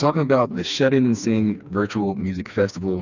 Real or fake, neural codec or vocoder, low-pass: fake; codec, 16 kHz, 2 kbps, FreqCodec, smaller model; 7.2 kHz